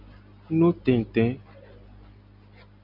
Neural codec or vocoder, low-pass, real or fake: none; 5.4 kHz; real